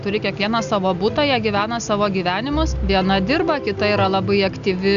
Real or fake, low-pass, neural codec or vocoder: real; 7.2 kHz; none